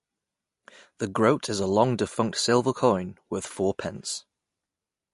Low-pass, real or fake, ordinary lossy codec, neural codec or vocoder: 14.4 kHz; real; MP3, 48 kbps; none